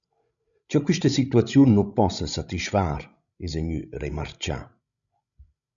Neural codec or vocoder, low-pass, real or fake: codec, 16 kHz, 16 kbps, FreqCodec, larger model; 7.2 kHz; fake